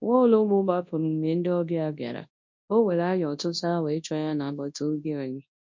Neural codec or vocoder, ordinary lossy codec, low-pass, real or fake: codec, 24 kHz, 0.9 kbps, WavTokenizer, large speech release; MP3, 48 kbps; 7.2 kHz; fake